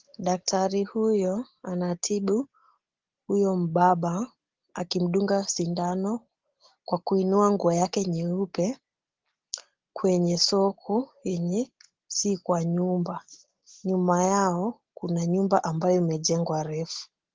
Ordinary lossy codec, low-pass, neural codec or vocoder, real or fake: Opus, 16 kbps; 7.2 kHz; none; real